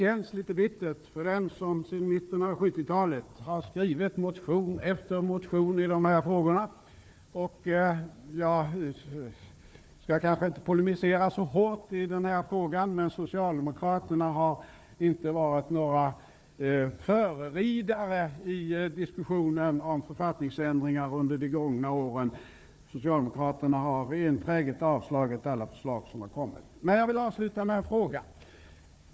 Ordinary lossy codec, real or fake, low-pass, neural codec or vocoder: none; fake; none; codec, 16 kHz, 4 kbps, FunCodec, trained on Chinese and English, 50 frames a second